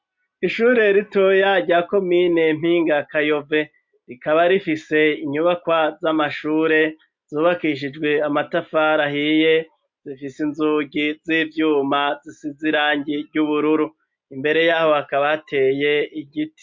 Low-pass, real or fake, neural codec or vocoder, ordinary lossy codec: 7.2 kHz; real; none; MP3, 48 kbps